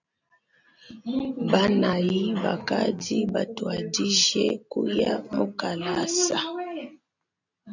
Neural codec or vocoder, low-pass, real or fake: none; 7.2 kHz; real